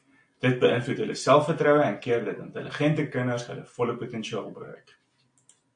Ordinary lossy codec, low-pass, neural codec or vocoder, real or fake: AAC, 64 kbps; 9.9 kHz; none; real